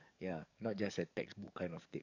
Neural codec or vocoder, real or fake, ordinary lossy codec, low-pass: codec, 44.1 kHz, 7.8 kbps, DAC; fake; AAC, 48 kbps; 7.2 kHz